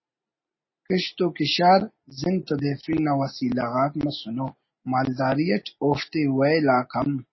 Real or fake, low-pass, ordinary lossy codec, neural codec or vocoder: real; 7.2 kHz; MP3, 24 kbps; none